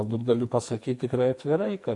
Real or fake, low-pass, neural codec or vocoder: fake; 10.8 kHz; codec, 44.1 kHz, 2.6 kbps, SNAC